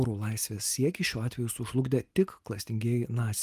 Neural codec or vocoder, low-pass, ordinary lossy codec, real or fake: none; 14.4 kHz; Opus, 32 kbps; real